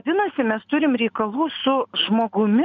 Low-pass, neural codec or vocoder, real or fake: 7.2 kHz; none; real